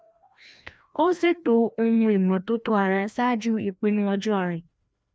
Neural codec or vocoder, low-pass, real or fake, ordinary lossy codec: codec, 16 kHz, 1 kbps, FreqCodec, larger model; none; fake; none